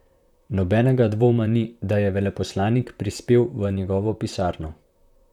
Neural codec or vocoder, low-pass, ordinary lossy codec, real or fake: vocoder, 44.1 kHz, 128 mel bands, Pupu-Vocoder; 19.8 kHz; none; fake